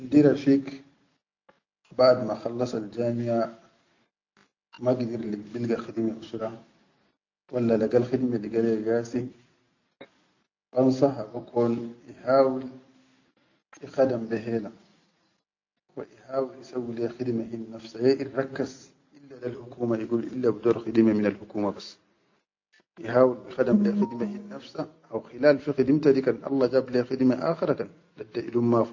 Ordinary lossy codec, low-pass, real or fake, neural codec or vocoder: AAC, 48 kbps; 7.2 kHz; real; none